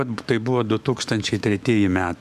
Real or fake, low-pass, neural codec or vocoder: fake; 14.4 kHz; autoencoder, 48 kHz, 128 numbers a frame, DAC-VAE, trained on Japanese speech